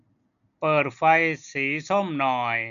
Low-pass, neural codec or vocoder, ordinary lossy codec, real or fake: 7.2 kHz; none; none; real